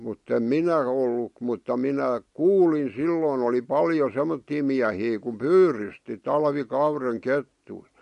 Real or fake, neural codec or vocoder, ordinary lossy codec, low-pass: real; none; MP3, 48 kbps; 14.4 kHz